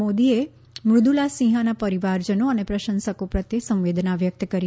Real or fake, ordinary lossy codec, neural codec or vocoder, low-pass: real; none; none; none